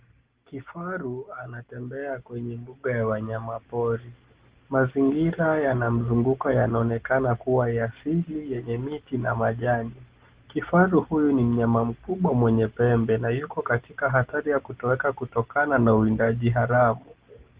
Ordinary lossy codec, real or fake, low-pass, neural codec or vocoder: Opus, 16 kbps; real; 3.6 kHz; none